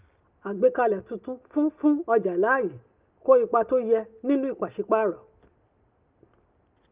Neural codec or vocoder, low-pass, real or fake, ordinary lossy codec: none; 3.6 kHz; real; Opus, 32 kbps